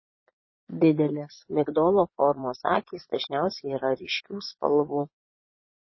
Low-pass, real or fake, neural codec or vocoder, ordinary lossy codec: 7.2 kHz; fake; vocoder, 22.05 kHz, 80 mel bands, Vocos; MP3, 24 kbps